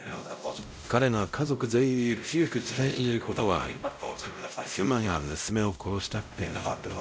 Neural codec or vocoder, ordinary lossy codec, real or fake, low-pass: codec, 16 kHz, 0.5 kbps, X-Codec, WavLM features, trained on Multilingual LibriSpeech; none; fake; none